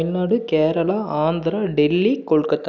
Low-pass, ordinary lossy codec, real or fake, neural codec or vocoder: 7.2 kHz; none; real; none